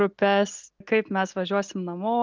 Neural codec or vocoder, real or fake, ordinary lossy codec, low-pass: none; real; Opus, 32 kbps; 7.2 kHz